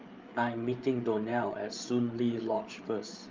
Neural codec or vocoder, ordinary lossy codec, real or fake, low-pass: codec, 16 kHz, 8 kbps, FreqCodec, larger model; Opus, 32 kbps; fake; 7.2 kHz